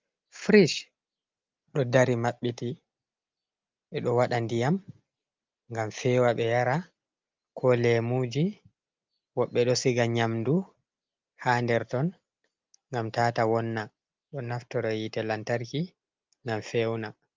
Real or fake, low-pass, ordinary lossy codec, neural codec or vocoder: real; 7.2 kHz; Opus, 24 kbps; none